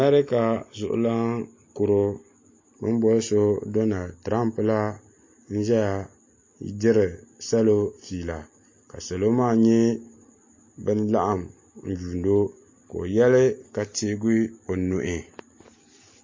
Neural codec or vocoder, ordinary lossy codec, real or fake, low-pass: none; MP3, 32 kbps; real; 7.2 kHz